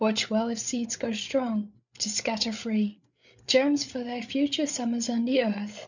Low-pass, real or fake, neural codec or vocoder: 7.2 kHz; fake; codec, 16 kHz, 8 kbps, FreqCodec, larger model